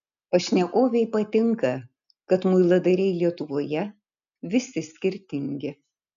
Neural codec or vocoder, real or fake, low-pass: none; real; 7.2 kHz